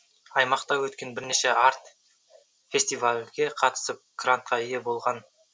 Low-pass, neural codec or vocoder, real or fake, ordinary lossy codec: none; none; real; none